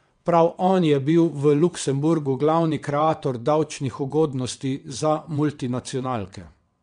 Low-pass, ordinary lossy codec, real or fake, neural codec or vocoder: 9.9 kHz; MP3, 64 kbps; fake; vocoder, 22.05 kHz, 80 mel bands, WaveNeXt